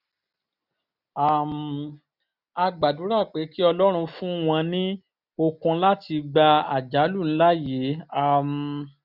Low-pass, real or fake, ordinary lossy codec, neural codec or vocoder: 5.4 kHz; real; none; none